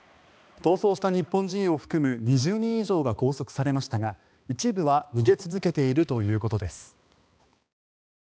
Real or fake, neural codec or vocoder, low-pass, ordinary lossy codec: fake; codec, 16 kHz, 2 kbps, X-Codec, HuBERT features, trained on balanced general audio; none; none